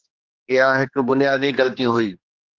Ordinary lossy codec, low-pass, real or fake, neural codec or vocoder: Opus, 16 kbps; 7.2 kHz; fake; codec, 16 kHz, 2 kbps, X-Codec, HuBERT features, trained on general audio